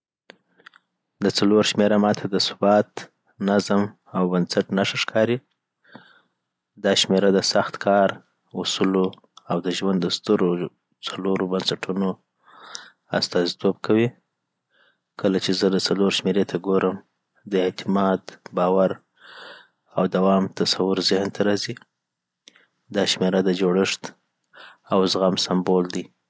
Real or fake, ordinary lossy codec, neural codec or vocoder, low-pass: real; none; none; none